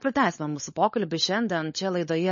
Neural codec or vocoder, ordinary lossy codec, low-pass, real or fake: codec, 16 kHz, 16 kbps, FunCodec, trained on LibriTTS, 50 frames a second; MP3, 32 kbps; 7.2 kHz; fake